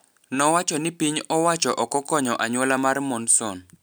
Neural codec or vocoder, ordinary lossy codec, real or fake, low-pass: none; none; real; none